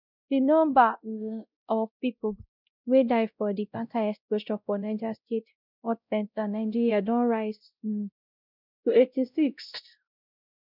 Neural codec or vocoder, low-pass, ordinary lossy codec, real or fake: codec, 16 kHz, 0.5 kbps, X-Codec, WavLM features, trained on Multilingual LibriSpeech; 5.4 kHz; none; fake